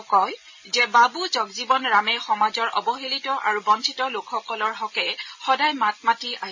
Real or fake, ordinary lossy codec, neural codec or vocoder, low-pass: real; MP3, 32 kbps; none; 7.2 kHz